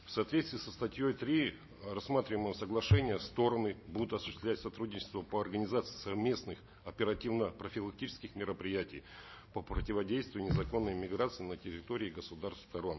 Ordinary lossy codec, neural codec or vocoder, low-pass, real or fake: MP3, 24 kbps; none; 7.2 kHz; real